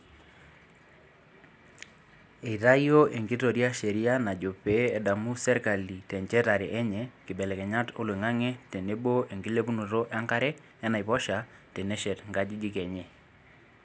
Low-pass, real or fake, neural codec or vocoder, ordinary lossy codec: none; real; none; none